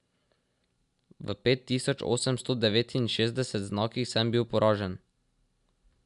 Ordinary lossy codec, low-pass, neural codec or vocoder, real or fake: none; 10.8 kHz; none; real